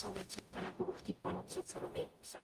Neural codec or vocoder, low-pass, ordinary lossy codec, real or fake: codec, 44.1 kHz, 0.9 kbps, DAC; 14.4 kHz; Opus, 32 kbps; fake